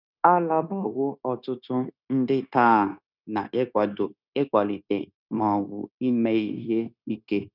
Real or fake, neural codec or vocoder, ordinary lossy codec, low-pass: fake; codec, 16 kHz, 0.9 kbps, LongCat-Audio-Codec; none; 5.4 kHz